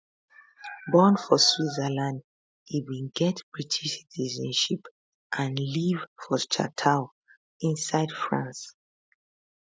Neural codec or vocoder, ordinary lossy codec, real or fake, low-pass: none; none; real; none